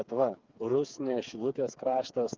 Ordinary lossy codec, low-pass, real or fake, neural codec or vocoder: Opus, 32 kbps; 7.2 kHz; fake; codec, 16 kHz, 4 kbps, FreqCodec, smaller model